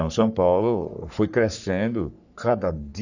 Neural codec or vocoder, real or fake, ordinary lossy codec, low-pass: codec, 44.1 kHz, 3.4 kbps, Pupu-Codec; fake; none; 7.2 kHz